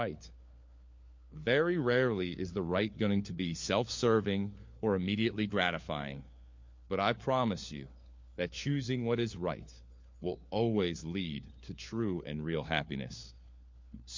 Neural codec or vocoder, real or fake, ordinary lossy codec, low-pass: codec, 16 kHz, 4 kbps, FunCodec, trained on LibriTTS, 50 frames a second; fake; MP3, 48 kbps; 7.2 kHz